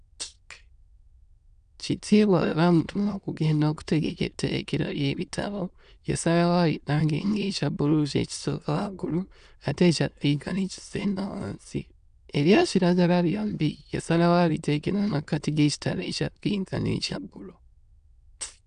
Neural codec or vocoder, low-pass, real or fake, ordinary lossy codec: autoencoder, 22.05 kHz, a latent of 192 numbers a frame, VITS, trained on many speakers; 9.9 kHz; fake; none